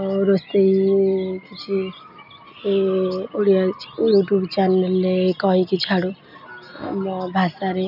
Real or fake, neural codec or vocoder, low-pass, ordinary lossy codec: real; none; 5.4 kHz; none